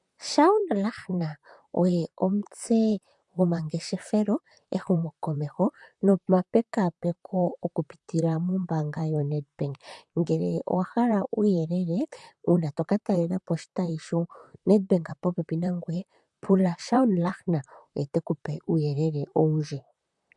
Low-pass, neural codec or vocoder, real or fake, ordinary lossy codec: 10.8 kHz; vocoder, 44.1 kHz, 128 mel bands, Pupu-Vocoder; fake; MP3, 96 kbps